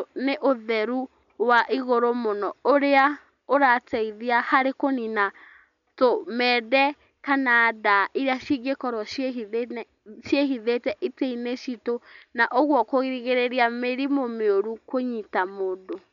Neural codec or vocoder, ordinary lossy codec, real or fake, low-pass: none; none; real; 7.2 kHz